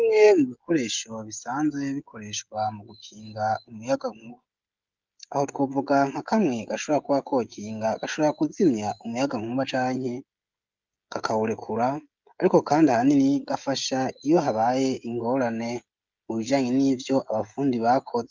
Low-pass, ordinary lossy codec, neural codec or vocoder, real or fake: 7.2 kHz; Opus, 24 kbps; codec, 16 kHz, 16 kbps, FreqCodec, smaller model; fake